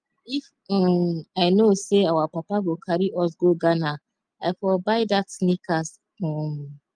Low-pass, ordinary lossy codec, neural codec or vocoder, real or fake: 9.9 kHz; Opus, 32 kbps; none; real